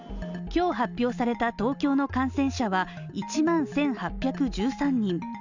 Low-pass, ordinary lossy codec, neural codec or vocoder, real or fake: 7.2 kHz; none; none; real